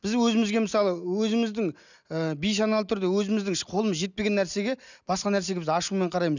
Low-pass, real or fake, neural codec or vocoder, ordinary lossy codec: 7.2 kHz; real; none; none